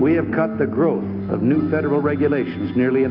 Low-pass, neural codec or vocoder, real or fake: 5.4 kHz; none; real